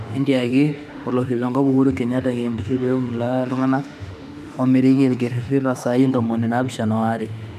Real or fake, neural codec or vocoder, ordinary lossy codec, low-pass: fake; autoencoder, 48 kHz, 32 numbers a frame, DAC-VAE, trained on Japanese speech; none; 14.4 kHz